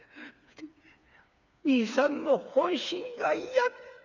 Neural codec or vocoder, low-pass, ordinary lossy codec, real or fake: codec, 16 kHz, 4 kbps, FreqCodec, smaller model; 7.2 kHz; none; fake